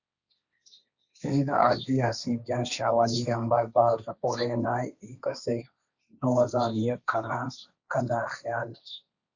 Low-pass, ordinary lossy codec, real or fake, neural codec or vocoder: 7.2 kHz; Opus, 64 kbps; fake; codec, 16 kHz, 1.1 kbps, Voila-Tokenizer